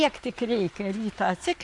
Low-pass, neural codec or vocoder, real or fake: 10.8 kHz; vocoder, 44.1 kHz, 128 mel bands every 512 samples, BigVGAN v2; fake